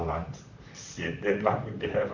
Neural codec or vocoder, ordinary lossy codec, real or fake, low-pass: vocoder, 44.1 kHz, 128 mel bands, Pupu-Vocoder; none; fake; 7.2 kHz